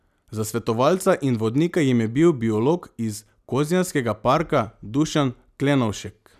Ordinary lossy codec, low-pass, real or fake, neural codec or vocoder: none; 14.4 kHz; real; none